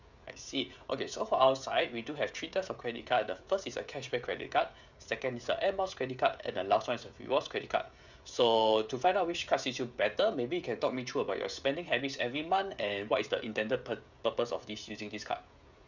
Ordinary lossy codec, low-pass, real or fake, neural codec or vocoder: none; 7.2 kHz; fake; codec, 16 kHz, 16 kbps, FreqCodec, smaller model